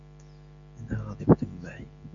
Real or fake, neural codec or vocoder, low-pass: real; none; 7.2 kHz